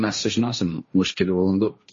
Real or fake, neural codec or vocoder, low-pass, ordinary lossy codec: fake; codec, 16 kHz, 1.1 kbps, Voila-Tokenizer; 7.2 kHz; MP3, 32 kbps